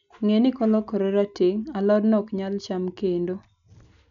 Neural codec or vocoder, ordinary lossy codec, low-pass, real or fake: none; none; 7.2 kHz; real